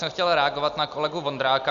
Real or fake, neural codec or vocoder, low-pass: real; none; 7.2 kHz